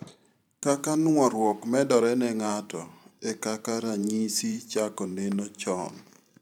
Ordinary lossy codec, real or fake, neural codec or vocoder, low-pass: none; fake; vocoder, 44.1 kHz, 128 mel bands every 512 samples, BigVGAN v2; 19.8 kHz